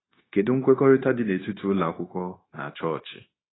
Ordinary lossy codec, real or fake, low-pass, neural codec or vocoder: AAC, 16 kbps; fake; 7.2 kHz; codec, 16 kHz, 0.9 kbps, LongCat-Audio-Codec